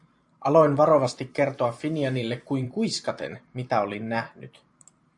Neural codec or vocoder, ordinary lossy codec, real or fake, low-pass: vocoder, 44.1 kHz, 128 mel bands every 512 samples, BigVGAN v2; AAC, 64 kbps; fake; 10.8 kHz